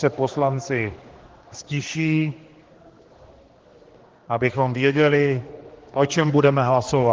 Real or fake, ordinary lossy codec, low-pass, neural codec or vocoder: fake; Opus, 16 kbps; 7.2 kHz; codec, 16 kHz, 2 kbps, X-Codec, HuBERT features, trained on general audio